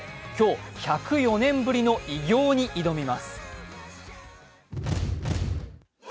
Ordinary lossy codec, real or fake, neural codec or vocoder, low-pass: none; real; none; none